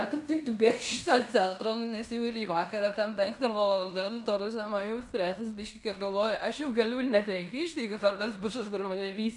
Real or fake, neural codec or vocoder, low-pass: fake; codec, 16 kHz in and 24 kHz out, 0.9 kbps, LongCat-Audio-Codec, fine tuned four codebook decoder; 10.8 kHz